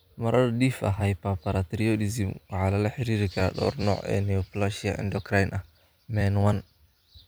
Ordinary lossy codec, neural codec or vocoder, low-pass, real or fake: none; vocoder, 44.1 kHz, 128 mel bands every 256 samples, BigVGAN v2; none; fake